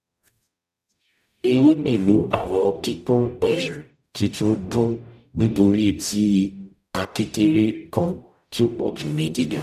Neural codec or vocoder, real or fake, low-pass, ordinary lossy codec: codec, 44.1 kHz, 0.9 kbps, DAC; fake; 14.4 kHz; none